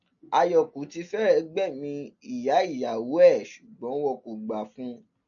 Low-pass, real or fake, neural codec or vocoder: 7.2 kHz; real; none